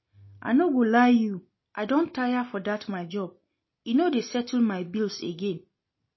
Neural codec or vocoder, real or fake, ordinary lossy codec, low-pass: none; real; MP3, 24 kbps; 7.2 kHz